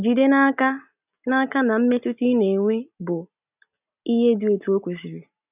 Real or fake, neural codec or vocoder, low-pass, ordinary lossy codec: real; none; 3.6 kHz; none